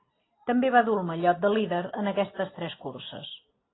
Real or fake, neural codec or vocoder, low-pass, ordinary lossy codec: real; none; 7.2 kHz; AAC, 16 kbps